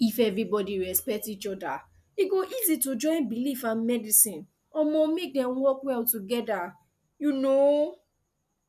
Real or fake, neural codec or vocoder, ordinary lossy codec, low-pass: real; none; none; 14.4 kHz